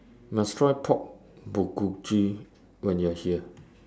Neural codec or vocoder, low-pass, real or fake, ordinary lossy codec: none; none; real; none